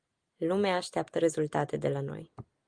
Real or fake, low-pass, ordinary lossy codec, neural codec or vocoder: real; 9.9 kHz; Opus, 24 kbps; none